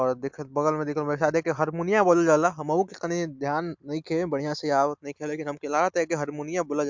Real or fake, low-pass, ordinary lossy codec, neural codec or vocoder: real; 7.2 kHz; MP3, 64 kbps; none